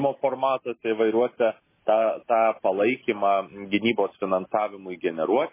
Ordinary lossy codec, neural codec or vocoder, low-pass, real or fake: MP3, 16 kbps; none; 3.6 kHz; real